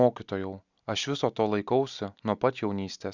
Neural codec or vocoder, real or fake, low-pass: none; real; 7.2 kHz